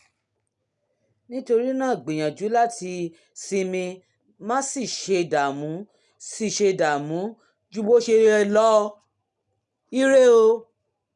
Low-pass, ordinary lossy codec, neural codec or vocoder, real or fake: 10.8 kHz; none; none; real